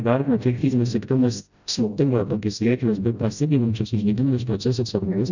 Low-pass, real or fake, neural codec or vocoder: 7.2 kHz; fake; codec, 16 kHz, 0.5 kbps, FreqCodec, smaller model